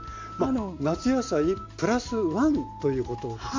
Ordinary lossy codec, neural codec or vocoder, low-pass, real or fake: MP3, 48 kbps; none; 7.2 kHz; real